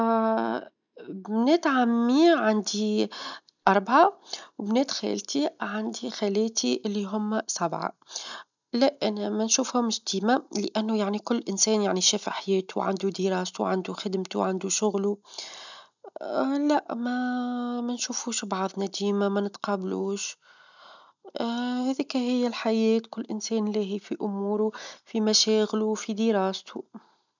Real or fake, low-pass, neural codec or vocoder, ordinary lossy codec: real; 7.2 kHz; none; none